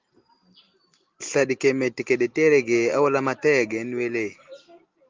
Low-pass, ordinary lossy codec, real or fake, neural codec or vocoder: 7.2 kHz; Opus, 32 kbps; real; none